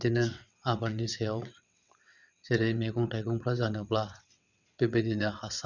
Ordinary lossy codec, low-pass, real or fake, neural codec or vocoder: none; 7.2 kHz; fake; vocoder, 22.05 kHz, 80 mel bands, WaveNeXt